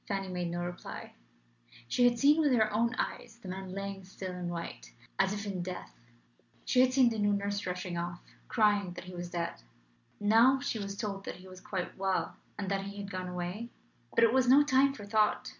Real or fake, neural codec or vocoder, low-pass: real; none; 7.2 kHz